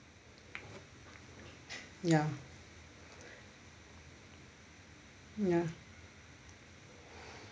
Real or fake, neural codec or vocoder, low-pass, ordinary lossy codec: real; none; none; none